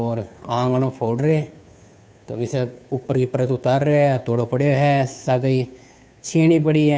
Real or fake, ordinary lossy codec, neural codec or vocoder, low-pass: fake; none; codec, 16 kHz, 2 kbps, FunCodec, trained on Chinese and English, 25 frames a second; none